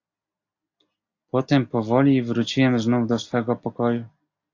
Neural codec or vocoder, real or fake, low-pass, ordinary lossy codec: none; real; 7.2 kHz; AAC, 48 kbps